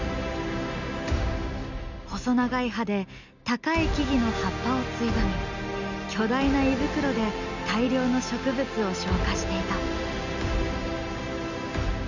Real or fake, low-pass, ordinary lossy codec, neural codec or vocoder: real; 7.2 kHz; none; none